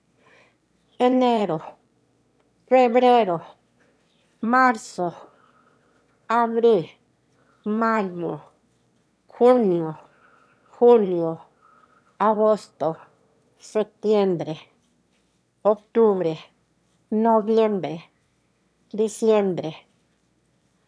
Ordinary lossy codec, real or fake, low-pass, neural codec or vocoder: none; fake; none; autoencoder, 22.05 kHz, a latent of 192 numbers a frame, VITS, trained on one speaker